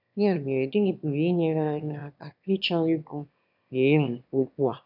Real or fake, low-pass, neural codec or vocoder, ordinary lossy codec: fake; 5.4 kHz; autoencoder, 22.05 kHz, a latent of 192 numbers a frame, VITS, trained on one speaker; none